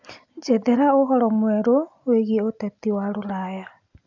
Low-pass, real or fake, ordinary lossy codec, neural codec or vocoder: 7.2 kHz; real; AAC, 48 kbps; none